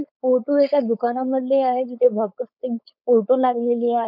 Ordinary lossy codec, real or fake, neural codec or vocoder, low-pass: none; fake; codec, 16 kHz, 4.8 kbps, FACodec; 5.4 kHz